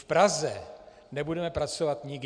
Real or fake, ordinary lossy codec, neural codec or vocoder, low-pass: real; MP3, 96 kbps; none; 9.9 kHz